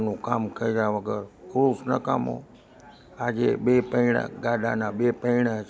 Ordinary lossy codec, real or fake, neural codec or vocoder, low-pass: none; real; none; none